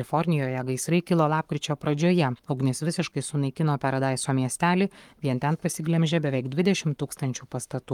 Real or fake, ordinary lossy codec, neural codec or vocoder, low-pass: fake; Opus, 32 kbps; codec, 44.1 kHz, 7.8 kbps, Pupu-Codec; 19.8 kHz